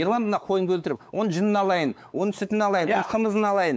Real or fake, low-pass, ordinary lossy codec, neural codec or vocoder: fake; none; none; codec, 16 kHz, 4 kbps, X-Codec, WavLM features, trained on Multilingual LibriSpeech